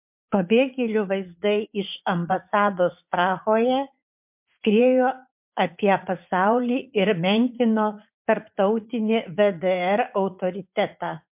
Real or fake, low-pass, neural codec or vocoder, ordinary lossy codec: fake; 3.6 kHz; vocoder, 44.1 kHz, 80 mel bands, Vocos; MP3, 32 kbps